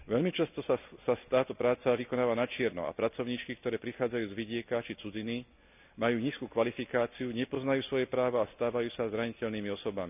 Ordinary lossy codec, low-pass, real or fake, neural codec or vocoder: none; 3.6 kHz; real; none